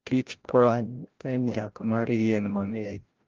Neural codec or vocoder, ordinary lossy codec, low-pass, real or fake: codec, 16 kHz, 0.5 kbps, FreqCodec, larger model; Opus, 32 kbps; 7.2 kHz; fake